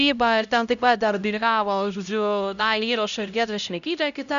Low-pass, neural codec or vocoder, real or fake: 7.2 kHz; codec, 16 kHz, 0.5 kbps, X-Codec, HuBERT features, trained on LibriSpeech; fake